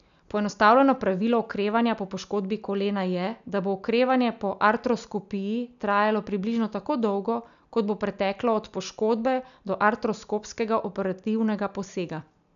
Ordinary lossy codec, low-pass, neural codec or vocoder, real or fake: none; 7.2 kHz; none; real